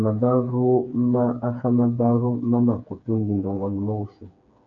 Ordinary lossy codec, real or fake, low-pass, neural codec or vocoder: AAC, 48 kbps; fake; 7.2 kHz; codec, 16 kHz, 4 kbps, FreqCodec, smaller model